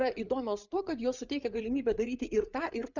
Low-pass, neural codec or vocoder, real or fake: 7.2 kHz; codec, 16 kHz, 8 kbps, FreqCodec, larger model; fake